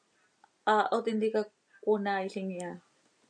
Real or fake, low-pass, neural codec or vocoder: real; 9.9 kHz; none